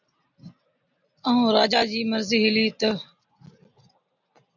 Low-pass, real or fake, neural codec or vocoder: 7.2 kHz; real; none